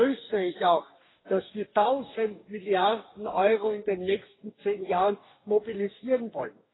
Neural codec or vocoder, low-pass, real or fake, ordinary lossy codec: codec, 44.1 kHz, 2.6 kbps, DAC; 7.2 kHz; fake; AAC, 16 kbps